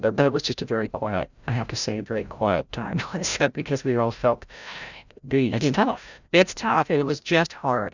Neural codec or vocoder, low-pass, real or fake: codec, 16 kHz, 0.5 kbps, FreqCodec, larger model; 7.2 kHz; fake